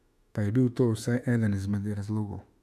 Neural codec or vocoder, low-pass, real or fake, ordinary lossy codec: autoencoder, 48 kHz, 32 numbers a frame, DAC-VAE, trained on Japanese speech; 14.4 kHz; fake; none